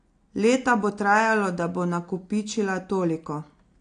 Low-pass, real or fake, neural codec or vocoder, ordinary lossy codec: 9.9 kHz; real; none; AAC, 48 kbps